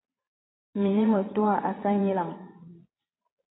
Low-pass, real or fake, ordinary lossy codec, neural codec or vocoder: 7.2 kHz; fake; AAC, 16 kbps; vocoder, 22.05 kHz, 80 mel bands, Vocos